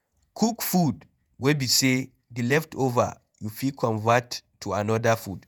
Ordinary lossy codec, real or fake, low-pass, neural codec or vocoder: none; fake; none; vocoder, 48 kHz, 128 mel bands, Vocos